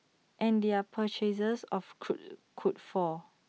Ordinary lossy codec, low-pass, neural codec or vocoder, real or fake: none; none; none; real